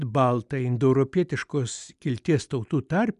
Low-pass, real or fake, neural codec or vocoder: 10.8 kHz; real; none